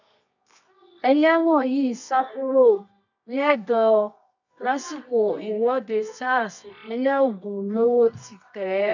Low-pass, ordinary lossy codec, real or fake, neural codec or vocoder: 7.2 kHz; none; fake; codec, 24 kHz, 0.9 kbps, WavTokenizer, medium music audio release